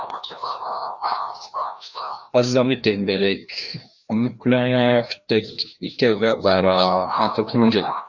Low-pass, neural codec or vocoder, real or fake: 7.2 kHz; codec, 16 kHz, 1 kbps, FreqCodec, larger model; fake